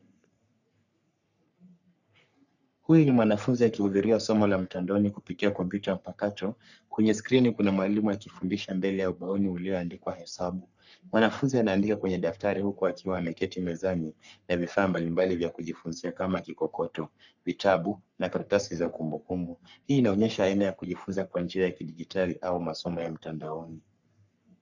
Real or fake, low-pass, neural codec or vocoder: fake; 7.2 kHz; codec, 44.1 kHz, 3.4 kbps, Pupu-Codec